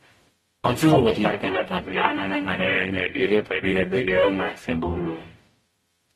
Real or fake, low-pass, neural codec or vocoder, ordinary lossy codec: fake; 19.8 kHz; codec, 44.1 kHz, 0.9 kbps, DAC; AAC, 32 kbps